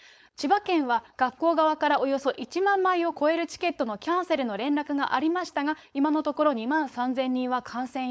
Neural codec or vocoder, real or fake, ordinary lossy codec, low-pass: codec, 16 kHz, 4.8 kbps, FACodec; fake; none; none